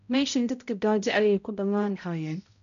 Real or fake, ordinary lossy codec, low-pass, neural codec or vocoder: fake; none; 7.2 kHz; codec, 16 kHz, 0.5 kbps, X-Codec, HuBERT features, trained on balanced general audio